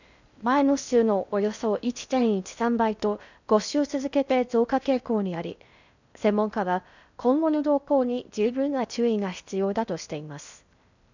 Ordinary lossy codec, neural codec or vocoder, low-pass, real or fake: none; codec, 16 kHz in and 24 kHz out, 0.6 kbps, FocalCodec, streaming, 2048 codes; 7.2 kHz; fake